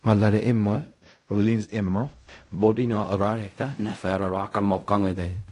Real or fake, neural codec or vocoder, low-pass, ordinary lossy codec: fake; codec, 16 kHz in and 24 kHz out, 0.4 kbps, LongCat-Audio-Codec, fine tuned four codebook decoder; 10.8 kHz; AAC, 48 kbps